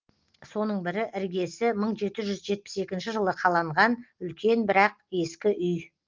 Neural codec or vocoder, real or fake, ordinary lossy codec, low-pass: none; real; Opus, 32 kbps; 7.2 kHz